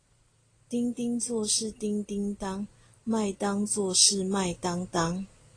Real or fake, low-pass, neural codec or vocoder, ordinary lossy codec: real; 9.9 kHz; none; AAC, 32 kbps